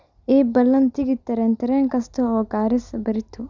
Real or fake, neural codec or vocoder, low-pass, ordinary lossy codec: real; none; 7.2 kHz; Opus, 64 kbps